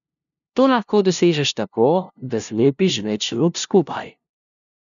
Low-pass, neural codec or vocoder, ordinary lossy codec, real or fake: 7.2 kHz; codec, 16 kHz, 0.5 kbps, FunCodec, trained on LibriTTS, 25 frames a second; none; fake